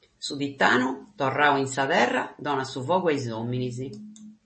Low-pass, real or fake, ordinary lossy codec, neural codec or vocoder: 9.9 kHz; real; MP3, 32 kbps; none